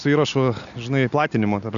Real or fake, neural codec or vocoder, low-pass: real; none; 7.2 kHz